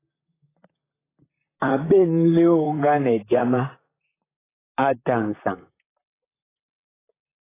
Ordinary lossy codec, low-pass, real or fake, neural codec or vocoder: AAC, 16 kbps; 3.6 kHz; fake; vocoder, 44.1 kHz, 128 mel bands, Pupu-Vocoder